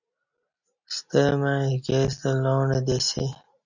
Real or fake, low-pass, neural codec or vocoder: real; 7.2 kHz; none